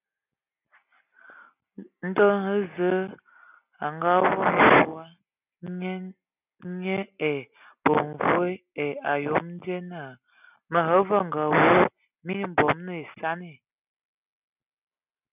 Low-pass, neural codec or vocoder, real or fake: 3.6 kHz; none; real